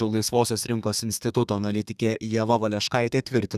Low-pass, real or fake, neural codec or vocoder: 14.4 kHz; fake; codec, 32 kHz, 1.9 kbps, SNAC